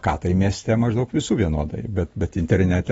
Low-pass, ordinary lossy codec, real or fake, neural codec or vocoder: 19.8 kHz; AAC, 24 kbps; real; none